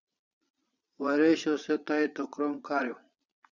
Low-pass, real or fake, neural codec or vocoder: 7.2 kHz; fake; vocoder, 22.05 kHz, 80 mel bands, Vocos